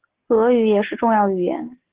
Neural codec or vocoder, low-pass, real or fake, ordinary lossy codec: none; 3.6 kHz; real; Opus, 16 kbps